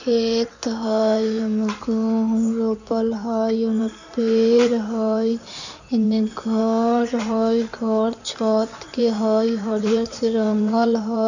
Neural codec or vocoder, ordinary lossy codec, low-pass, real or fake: codec, 16 kHz in and 24 kHz out, 2.2 kbps, FireRedTTS-2 codec; none; 7.2 kHz; fake